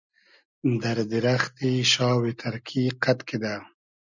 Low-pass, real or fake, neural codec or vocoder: 7.2 kHz; real; none